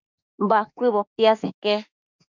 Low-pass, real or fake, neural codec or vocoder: 7.2 kHz; fake; autoencoder, 48 kHz, 32 numbers a frame, DAC-VAE, trained on Japanese speech